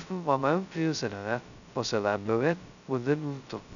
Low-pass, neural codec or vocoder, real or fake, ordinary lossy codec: 7.2 kHz; codec, 16 kHz, 0.2 kbps, FocalCodec; fake; none